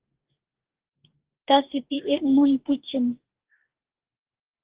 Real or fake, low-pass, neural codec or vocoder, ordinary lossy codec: fake; 3.6 kHz; codec, 44.1 kHz, 2.6 kbps, DAC; Opus, 16 kbps